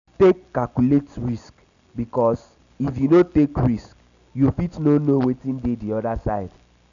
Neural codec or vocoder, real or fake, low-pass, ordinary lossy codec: none; real; 7.2 kHz; none